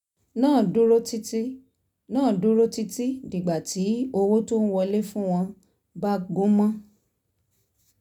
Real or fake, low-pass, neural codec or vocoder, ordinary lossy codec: real; 19.8 kHz; none; none